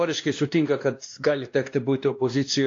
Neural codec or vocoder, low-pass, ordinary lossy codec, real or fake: codec, 16 kHz, 1 kbps, X-Codec, WavLM features, trained on Multilingual LibriSpeech; 7.2 kHz; AAC, 48 kbps; fake